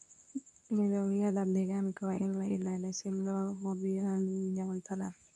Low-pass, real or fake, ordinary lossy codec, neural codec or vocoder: none; fake; none; codec, 24 kHz, 0.9 kbps, WavTokenizer, medium speech release version 2